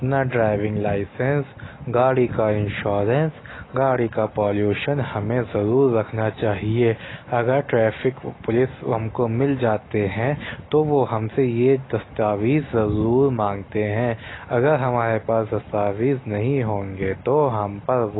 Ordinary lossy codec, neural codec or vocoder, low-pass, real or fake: AAC, 16 kbps; none; 7.2 kHz; real